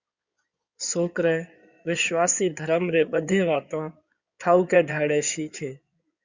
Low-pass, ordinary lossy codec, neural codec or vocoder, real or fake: 7.2 kHz; Opus, 64 kbps; codec, 16 kHz in and 24 kHz out, 2.2 kbps, FireRedTTS-2 codec; fake